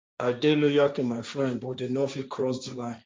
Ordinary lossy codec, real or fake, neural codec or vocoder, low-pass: none; fake; codec, 16 kHz, 1.1 kbps, Voila-Tokenizer; none